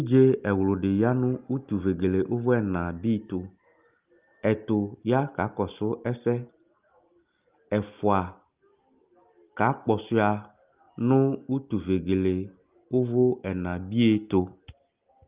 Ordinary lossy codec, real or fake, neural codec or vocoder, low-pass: Opus, 32 kbps; real; none; 3.6 kHz